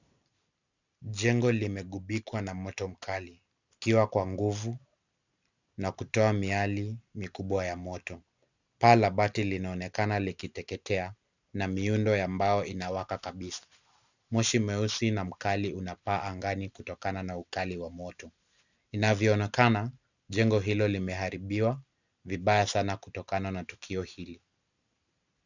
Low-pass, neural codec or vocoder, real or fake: 7.2 kHz; none; real